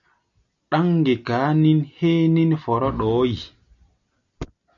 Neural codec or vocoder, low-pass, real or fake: none; 7.2 kHz; real